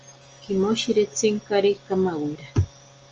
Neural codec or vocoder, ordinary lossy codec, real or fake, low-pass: none; Opus, 24 kbps; real; 7.2 kHz